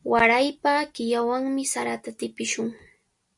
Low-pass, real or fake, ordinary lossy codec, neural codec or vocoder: 10.8 kHz; real; MP3, 64 kbps; none